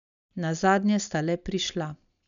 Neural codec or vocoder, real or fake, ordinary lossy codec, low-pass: none; real; none; 7.2 kHz